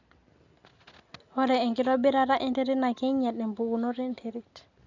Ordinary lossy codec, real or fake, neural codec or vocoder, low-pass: none; real; none; 7.2 kHz